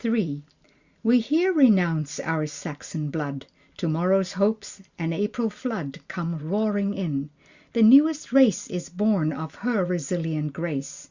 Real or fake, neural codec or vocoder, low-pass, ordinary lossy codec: real; none; 7.2 kHz; Opus, 64 kbps